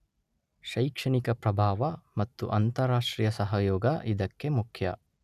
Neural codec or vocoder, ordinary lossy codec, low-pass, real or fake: none; none; 14.4 kHz; real